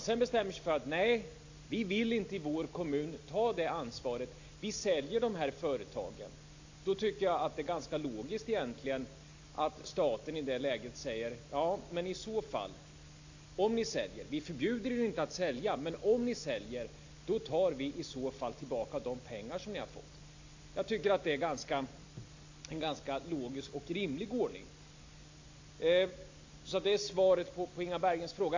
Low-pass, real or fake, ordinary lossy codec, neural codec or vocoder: 7.2 kHz; real; AAC, 48 kbps; none